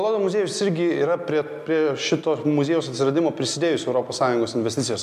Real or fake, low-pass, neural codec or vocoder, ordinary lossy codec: real; 14.4 kHz; none; MP3, 96 kbps